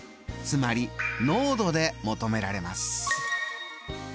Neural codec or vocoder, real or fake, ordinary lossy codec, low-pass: none; real; none; none